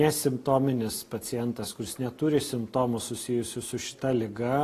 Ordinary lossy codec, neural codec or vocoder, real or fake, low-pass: AAC, 48 kbps; none; real; 14.4 kHz